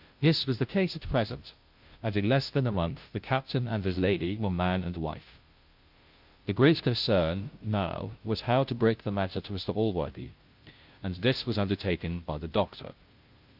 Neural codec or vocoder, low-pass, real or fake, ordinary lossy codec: codec, 16 kHz, 0.5 kbps, FunCodec, trained on Chinese and English, 25 frames a second; 5.4 kHz; fake; Opus, 24 kbps